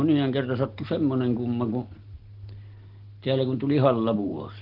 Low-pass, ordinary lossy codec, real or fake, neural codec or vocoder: 5.4 kHz; Opus, 16 kbps; real; none